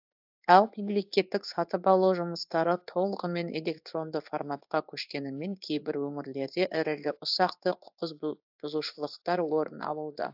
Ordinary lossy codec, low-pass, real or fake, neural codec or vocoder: AAC, 48 kbps; 5.4 kHz; fake; codec, 16 kHz in and 24 kHz out, 1 kbps, XY-Tokenizer